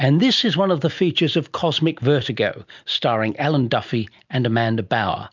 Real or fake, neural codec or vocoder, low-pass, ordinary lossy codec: real; none; 7.2 kHz; MP3, 64 kbps